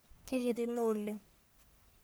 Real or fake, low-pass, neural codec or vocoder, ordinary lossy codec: fake; none; codec, 44.1 kHz, 1.7 kbps, Pupu-Codec; none